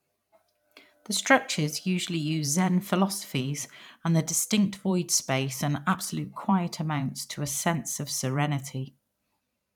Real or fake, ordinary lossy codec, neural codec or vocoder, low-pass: fake; none; vocoder, 44.1 kHz, 128 mel bands every 512 samples, BigVGAN v2; 19.8 kHz